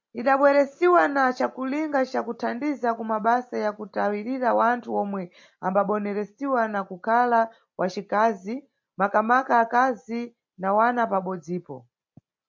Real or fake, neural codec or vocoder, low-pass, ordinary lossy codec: real; none; 7.2 kHz; MP3, 48 kbps